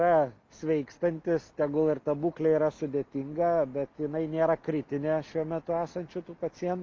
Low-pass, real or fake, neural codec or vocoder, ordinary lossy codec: 7.2 kHz; real; none; Opus, 32 kbps